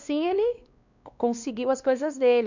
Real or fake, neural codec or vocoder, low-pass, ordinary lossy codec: fake; codec, 16 kHz, 2 kbps, X-Codec, WavLM features, trained on Multilingual LibriSpeech; 7.2 kHz; none